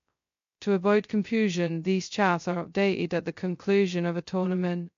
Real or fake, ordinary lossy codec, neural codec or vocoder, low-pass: fake; MP3, 48 kbps; codec, 16 kHz, 0.2 kbps, FocalCodec; 7.2 kHz